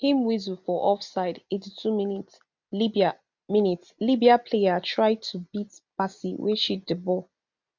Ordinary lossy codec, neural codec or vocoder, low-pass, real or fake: none; none; 7.2 kHz; real